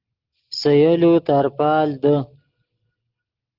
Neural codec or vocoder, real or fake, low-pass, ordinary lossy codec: none; real; 5.4 kHz; Opus, 16 kbps